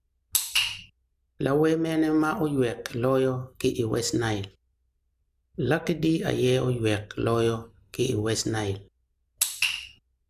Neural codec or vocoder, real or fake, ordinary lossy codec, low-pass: none; real; AAC, 96 kbps; 14.4 kHz